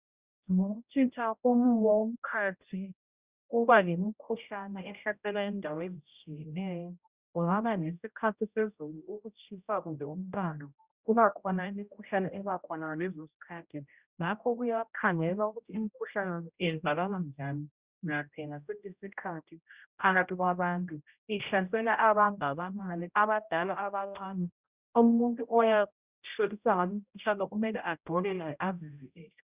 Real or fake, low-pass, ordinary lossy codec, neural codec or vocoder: fake; 3.6 kHz; Opus, 64 kbps; codec, 16 kHz, 0.5 kbps, X-Codec, HuBERT features, trained on general audio